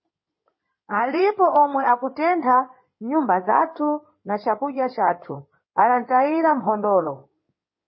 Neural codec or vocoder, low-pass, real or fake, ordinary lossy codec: codec, 16 kHz in and 24 kHz out, 2.2 kbps, FireRedTTS-2 codec; 7.2 kHz; fake; MP3, 24 kbps